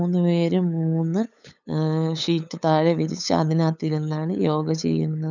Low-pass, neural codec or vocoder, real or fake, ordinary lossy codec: 7.2 kHz; codec, 16 kHz, 8 kbps, FunCodec, trained on LibriTTS, 25 frames a second; fake; none